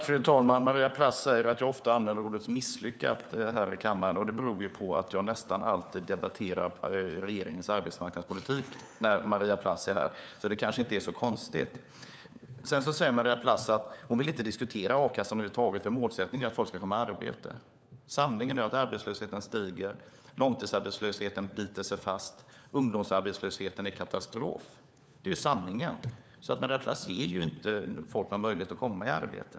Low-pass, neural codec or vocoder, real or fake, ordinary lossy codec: none; codec, 16 kHz, 4 kbps, FunCodec, trained on LibriTTS, 50 frames a second; fake; none